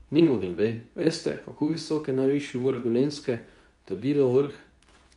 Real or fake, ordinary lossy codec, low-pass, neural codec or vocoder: fake; none; 10.8 kHz; codec, 24 kHz, 0.9 kbps, WavTokenizer, medium speech release version 2